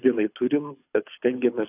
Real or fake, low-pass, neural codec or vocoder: fake; 3.6 kHz; codec, 24 kHz, 6 kbps, HILCodec